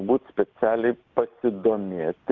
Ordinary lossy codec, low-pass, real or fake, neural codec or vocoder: Opus, 24 kbps; 7.2 kHz; real; none